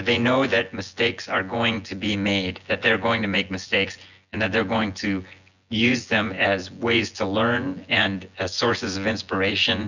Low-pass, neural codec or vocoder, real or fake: 7.2 kHz; vocoder, 24 kHz, 100 mel bands, Vocos; fake